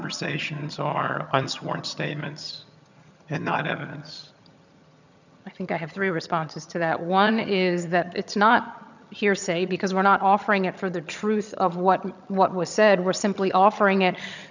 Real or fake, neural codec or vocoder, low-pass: fake; vocoder, 22.05 kHz, 80 mel bands, HiFi-GAN; 7.2 kHz